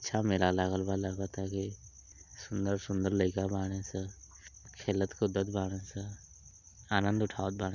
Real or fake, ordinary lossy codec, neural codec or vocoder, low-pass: real; none; none; 7.2 kHz